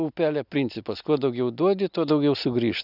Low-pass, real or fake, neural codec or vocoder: 5.4 kHz; real; none